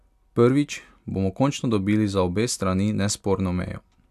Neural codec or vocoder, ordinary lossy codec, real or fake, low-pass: none; none; real; 14.4 kHz